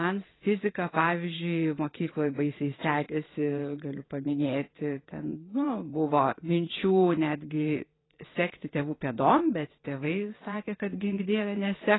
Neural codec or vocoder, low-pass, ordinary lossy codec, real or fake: vocoder, 22.05 kHz, 80 mel bands, WaveNeXt; 7.2 kHz; AAC, 16 kbps; fake